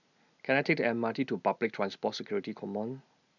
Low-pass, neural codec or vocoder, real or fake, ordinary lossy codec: 7.2 kHz; none; real; none